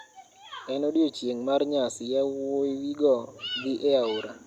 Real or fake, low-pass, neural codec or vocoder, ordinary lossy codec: real; 19.8 kHz; none; Opus, 64 kbps